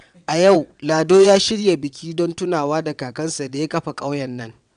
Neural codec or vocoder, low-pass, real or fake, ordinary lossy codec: vocoder, 22.05 kHz, 80 mel bands, WaveNeXt; 9.9 kHz; fake; AAC, 96 kbps